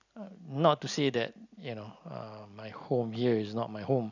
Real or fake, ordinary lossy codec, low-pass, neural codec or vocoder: real; none; 7.2 kHz; none